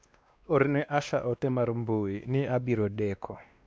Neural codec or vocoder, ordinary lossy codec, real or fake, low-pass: codec, 16 kHz, 2 kbps, X-Codec, WavLM features, trained on Multilingual LibriSpeech; none; fake; none